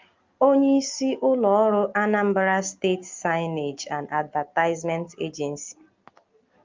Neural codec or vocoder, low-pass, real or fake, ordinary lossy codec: none; 7.2 kHz; real; Opus, 24 kbps